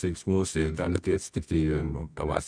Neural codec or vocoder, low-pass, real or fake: codec, 24 kHz, 0.9 kbps, WavTokenizer, medium music audio release; 9.9 kHz; fake